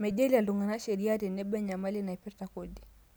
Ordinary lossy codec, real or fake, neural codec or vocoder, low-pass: none; real; none; none